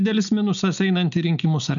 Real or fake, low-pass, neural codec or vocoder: real; 7.2 kHz; none